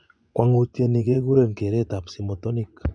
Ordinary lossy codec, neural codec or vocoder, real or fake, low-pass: none; vocoder, 24 kHz, 100 mel bands, Vocos; fake; 9.9 kHz